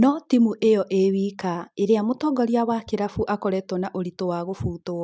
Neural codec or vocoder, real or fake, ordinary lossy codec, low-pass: none; real; none; none